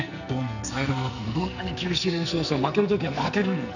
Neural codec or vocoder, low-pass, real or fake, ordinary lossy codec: codec, 44.1 kHz, 2.6 kbps, SNAC; 7.2 kHz; fake; none